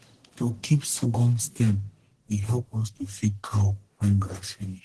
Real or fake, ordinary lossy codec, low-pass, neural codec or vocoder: fake; Opus, 16 kbps; 10.8 kHz; codec, 44.1 kHz, 1.7 kbps, Pupu-Codec